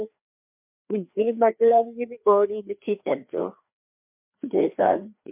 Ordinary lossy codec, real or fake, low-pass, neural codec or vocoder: MP3, 32 kbps; fake; 3.6 kHz; codec, 16 kHz, 2 kbps, FreqCodec, larger model